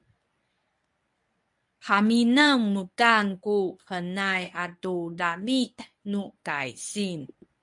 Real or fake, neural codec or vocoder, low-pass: fake; codec, 24 kHz, 0.9 kbps, WavTokenizer, medium speech release version 1; 10.8 kHz